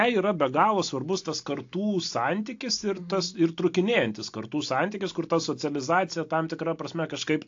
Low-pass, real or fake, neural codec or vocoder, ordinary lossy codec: 7.2 kHz; real; none; AAC, 48 kbps